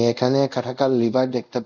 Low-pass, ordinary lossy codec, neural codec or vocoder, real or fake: 7.2 kHz; none; codec, 24 kHz, 0.5 kbps, DualCodec; fake